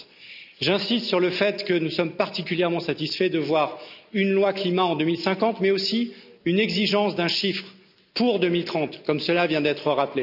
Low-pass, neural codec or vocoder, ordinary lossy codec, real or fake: 5.4 kHz; none; none; real